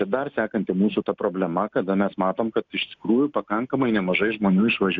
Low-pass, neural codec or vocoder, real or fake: 7.2 kHz; none; real